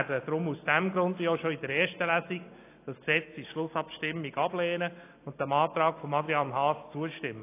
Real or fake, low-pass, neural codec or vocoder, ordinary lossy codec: real; 3.6 kHz; none; AAC, 24 kbps